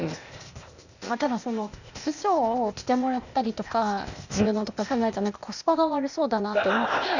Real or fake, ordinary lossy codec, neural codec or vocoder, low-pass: fake; none; codec, 16 kHz, 0.8 kbps, ZipCodec; 7.2 kHz